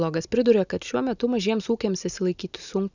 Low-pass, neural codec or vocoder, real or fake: 7.2 kHz; none; real